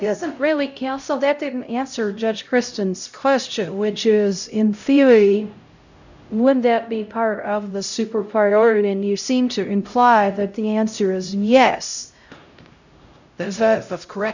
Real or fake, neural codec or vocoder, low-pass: fake; codec, 16 kHz, 0.5 kbps, X-Codec, HuBERT features, trained on LibriSpeech; 7.2 kHz